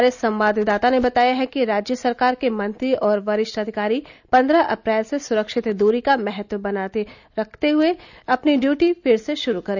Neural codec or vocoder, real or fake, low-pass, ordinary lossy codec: none; real; 7.2 kHz; none